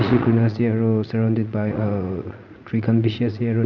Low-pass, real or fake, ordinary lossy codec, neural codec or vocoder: 7.2 kHz; fake; none; vocoder, 44.1 kHz, 80 mel bands, Vocos